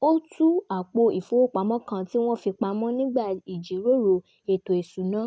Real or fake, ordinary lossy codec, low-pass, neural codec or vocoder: real; none; none; none